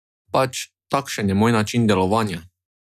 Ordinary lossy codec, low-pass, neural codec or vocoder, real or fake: none; 14.4 kHz; none; real